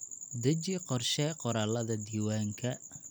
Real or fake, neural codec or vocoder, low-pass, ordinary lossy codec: real; none; none; none